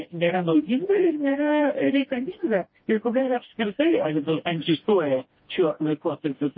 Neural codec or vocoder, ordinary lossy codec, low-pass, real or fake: codec, 16 kHz, 1 kbps, FreqCodec, smaller model; MP3, 24 kbps; 7.2 kHz; fake